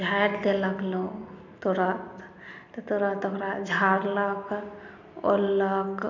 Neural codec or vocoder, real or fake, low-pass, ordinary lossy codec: none; real; 7.2 kHz; none